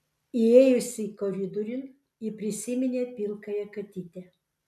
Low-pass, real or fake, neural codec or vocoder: 14.4 kHz; real; none